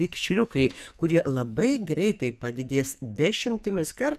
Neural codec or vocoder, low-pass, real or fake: codec, 44.1 kHz, 2.6 kbps, SNAC; 14.4 kHz; fake